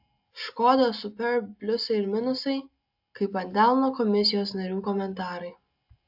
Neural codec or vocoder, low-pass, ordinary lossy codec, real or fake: none; 5.4 kHz; AAC, 48 kbps; real